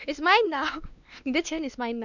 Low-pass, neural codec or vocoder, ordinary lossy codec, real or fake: 7.2 kHz; codec, 16 kHz, 2 kbps, X-Codec, WavLM features, trained on Multilingual LibriSpeech; none; fake